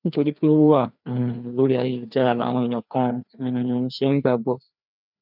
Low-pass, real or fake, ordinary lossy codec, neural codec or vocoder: 5.4 kHz; fake; none; codec, 16 kHz, 2 kbps, FreqCodec, larger model